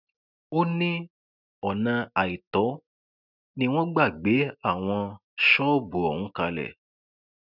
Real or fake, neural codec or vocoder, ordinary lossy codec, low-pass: real; none; none; 5.4 kHz